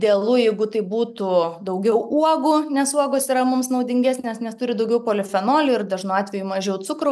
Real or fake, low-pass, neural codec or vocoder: real; 14.4 kHz; none